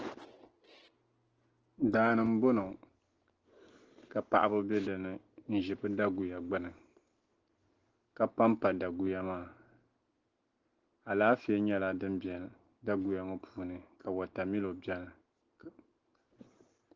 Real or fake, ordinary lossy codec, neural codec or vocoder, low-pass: real; Opus, 16 kbps; none; 7.2 kHz